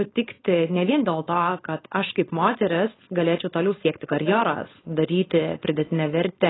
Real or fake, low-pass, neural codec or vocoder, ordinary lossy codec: fake; 7.2 kHz; codec, 16 kHz, 4.8 kbps, FACodec; AAC, 16 kbps